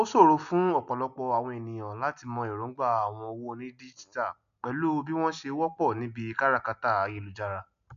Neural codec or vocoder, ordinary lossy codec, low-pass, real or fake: none; none; 7.2 kHz; real